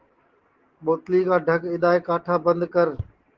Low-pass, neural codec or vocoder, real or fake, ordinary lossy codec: 7.2 kHz; none; real; Opus, 16 kbps